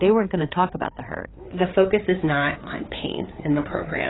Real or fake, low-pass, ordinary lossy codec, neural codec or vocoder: fake; 7.2 kHz; AAC, 16 kbps; codec, 16 kHz, 4 kbps, FreqCodec, larger model